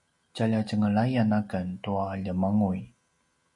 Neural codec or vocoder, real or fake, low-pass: none; real; 10.8 kHz